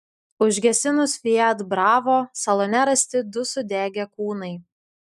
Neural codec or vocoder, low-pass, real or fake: none; 14.4 kHz; real